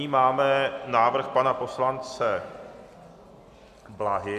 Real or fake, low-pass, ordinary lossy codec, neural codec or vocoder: real; 14.4 kHz; MP3, 96 kbps; none